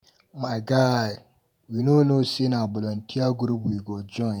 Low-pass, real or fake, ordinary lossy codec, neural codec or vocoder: none; fake; none; vocoder, 48 kHz, 128 mel bands, Vocos